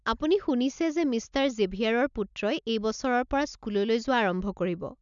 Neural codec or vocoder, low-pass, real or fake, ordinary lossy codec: none; 7.2 kHz; real; none